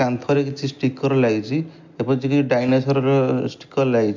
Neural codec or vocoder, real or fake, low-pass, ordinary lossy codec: none; real; 7.2 kHz; MP3, 48 kbps